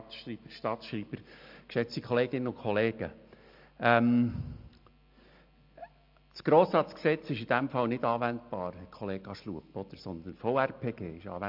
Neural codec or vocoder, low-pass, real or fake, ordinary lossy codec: none; 5.4 kHz; real; MP3, 48 kbps